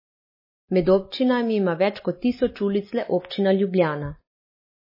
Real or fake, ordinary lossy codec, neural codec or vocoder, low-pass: real; MP3, 24 kbps; none; 5.4 kHz